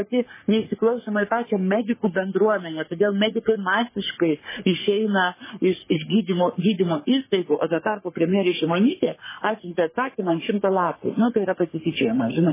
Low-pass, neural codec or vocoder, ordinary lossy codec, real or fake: 3.6 kHz; codec, 44.1 kHz, 3.4 kbps, Pupu-Codec; MP3, 16 kbps; fake